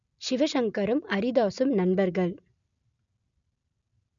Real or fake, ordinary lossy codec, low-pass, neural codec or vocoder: real; none; 7.2 kHz; none